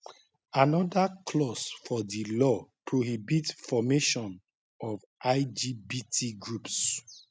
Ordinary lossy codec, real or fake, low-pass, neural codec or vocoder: none; real; none; none